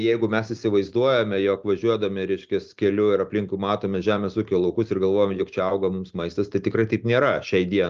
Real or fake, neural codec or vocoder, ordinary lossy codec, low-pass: real; none; Opus, 24 kbps; 7.2 kHz